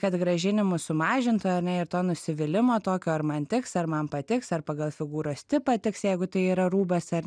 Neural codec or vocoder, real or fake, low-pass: none; real; 9.9 kHz